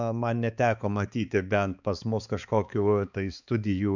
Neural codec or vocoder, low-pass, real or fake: codec, 16 kHz, 4 kbps, X-Codec, HuBERT features, trained on balanced general audio; 7.2 kHz; fake